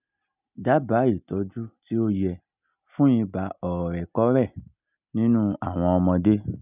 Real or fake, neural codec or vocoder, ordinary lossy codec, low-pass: real; none; none; 3.6 kHz